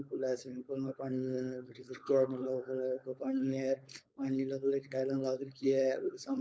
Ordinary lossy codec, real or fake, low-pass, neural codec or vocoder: none; fake; none; codec, 16 kHz, 4.8 kbps, FACodec